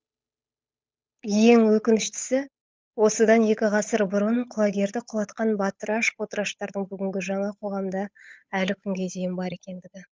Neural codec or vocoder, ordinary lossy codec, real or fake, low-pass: codec, 16 kHz, 8 kbps, FunCodec, trained on Chinese and English, 25 frames a second; none; fake; none